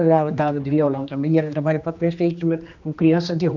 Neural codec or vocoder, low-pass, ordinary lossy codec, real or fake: codec, 16 kHz, 2 kbps, X-Codec, HuBERT features, trained on general audio; 7.2 kHz; none; fake